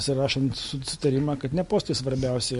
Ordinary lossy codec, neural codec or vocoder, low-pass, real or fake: MP3, 48 kbps; none; 14.4 kHz; real